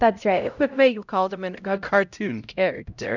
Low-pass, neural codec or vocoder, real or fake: 7.2 kHz; codec, 16 kHz, 0.5 kbps, X-Codec, HuBERT features, trained on LibriSpeech; fake